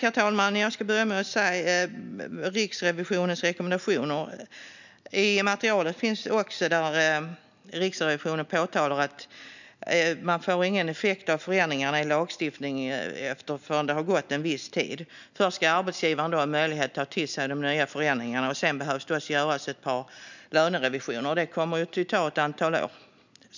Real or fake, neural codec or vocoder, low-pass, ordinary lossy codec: real; none; 7.2 kHz; none